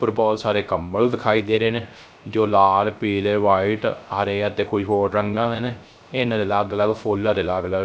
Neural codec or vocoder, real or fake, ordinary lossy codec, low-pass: codec, 16 kHz, 0.3 kbps, FocalCodec; fake; none; none